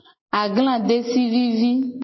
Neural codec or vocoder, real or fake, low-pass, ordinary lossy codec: none; real; 7.2 kHz; MP3, 24 kbps